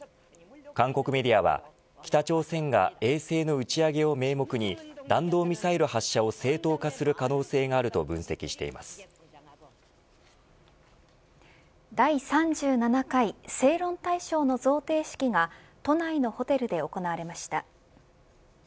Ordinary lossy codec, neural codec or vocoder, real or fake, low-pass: none; none; real; none